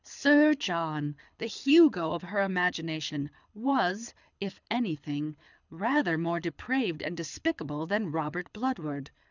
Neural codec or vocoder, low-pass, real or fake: codec, 24 kHz, 6 kbps, HILCodec; 7.2 kHz; fake